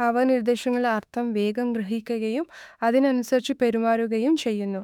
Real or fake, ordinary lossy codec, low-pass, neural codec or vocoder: fake; none; 19.8 kHz; autoencoder, 48 kHz, 32 numbers a frame, DAC-VAE, trained on Japanese speech